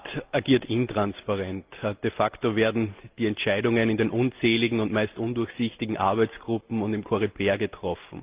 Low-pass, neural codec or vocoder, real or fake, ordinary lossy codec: 3.6 kHz; none; real; Opus, 32 kbps